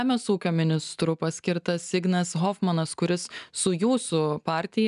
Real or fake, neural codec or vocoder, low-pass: real; none; 10.8 kHz